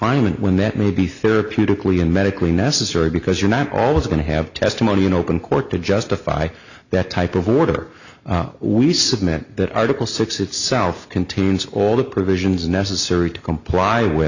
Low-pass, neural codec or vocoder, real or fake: 7.2 kHz; none; real